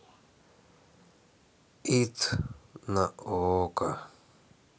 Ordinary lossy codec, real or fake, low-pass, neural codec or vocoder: none; real; none; none